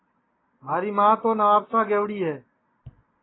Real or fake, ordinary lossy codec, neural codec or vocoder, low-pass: real; AAC, 16 kbps; none; 7.2 kHz